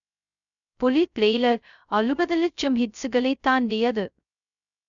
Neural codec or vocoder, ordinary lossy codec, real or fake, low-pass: codec, 16 kHz, 0.2 kbps, FocalCodec; none; fake; 7.2 kHz